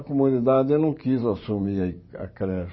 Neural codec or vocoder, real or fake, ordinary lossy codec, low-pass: vocoder, 44.1 kHz, 128 mel bands every 512 samples, BigVGAN v2; fake; MP3, 24 kbps; 7.2 kHz